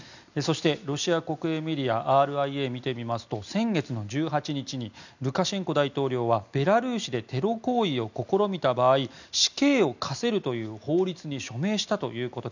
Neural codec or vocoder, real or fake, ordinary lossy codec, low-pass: none; real; none; 7.2 kHz